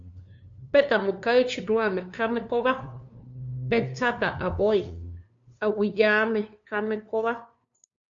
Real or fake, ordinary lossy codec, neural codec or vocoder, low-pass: fake; AAC, 64 kbps; codec, 16 kHz, 2 kbps, FunCodec, trained on LibriTTS, 25 frames a second; 7.2 kHz